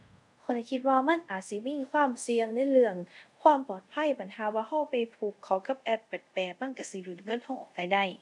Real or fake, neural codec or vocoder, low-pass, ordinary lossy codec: fake; codec, 24 kHz, 0.5 kbps, DualCodec; 10.8 kHz; none